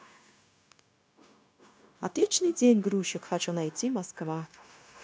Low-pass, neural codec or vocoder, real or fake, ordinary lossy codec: none; codec, 16 kHz, 0.9 kbps, LongCat-Audio-Codec; fake; none